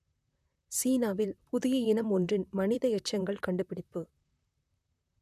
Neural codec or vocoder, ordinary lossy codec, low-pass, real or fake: vocoder, 44.1 kHz, 128 mel bands, Pupu-Vocoder; none; 14.4 kHz; fake